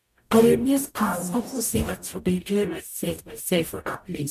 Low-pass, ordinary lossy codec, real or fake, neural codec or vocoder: 14.4 kHz; none; fake; codec, 44.1 kHz, 0.9 kbps, DAC